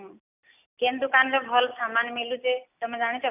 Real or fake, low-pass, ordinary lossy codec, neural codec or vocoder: real; 3.6 kHz; none; none